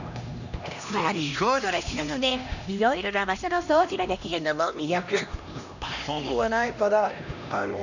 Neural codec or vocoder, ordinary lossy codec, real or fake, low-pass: codec, 16 kHz, 1 kbps, X-Codec, HuBERT features, trained on LibriSpeech; none; fake; 7.2 kHz